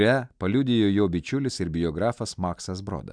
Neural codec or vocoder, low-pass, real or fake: none; 9.9 kHz; real